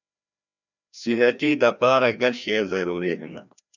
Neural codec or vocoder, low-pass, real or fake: codec, 16 kHz, 1 kbps, FreqCodec, larger model; 7.2 kHz; fake